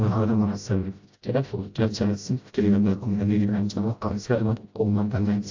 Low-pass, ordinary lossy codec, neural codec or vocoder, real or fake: 7.2 kHz; AAC, 48 kbps; codec, 16 kHz, 0.5 kbps, FreqCodec, smaller model; fake